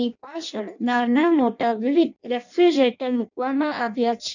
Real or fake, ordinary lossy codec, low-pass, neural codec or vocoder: fake; none; 7.2 kHz; codec, 16 kHz in and 24 kHz out, 0.6 kbps, FireRedTTS-2 codec